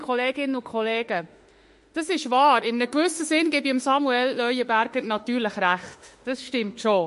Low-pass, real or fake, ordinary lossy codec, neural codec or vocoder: 14.4 kHz; fake; MP3, 48 kbps; autoencoder, 48 kHz, 32 numbers a frame, DAC-VAE, trained on Japanese speech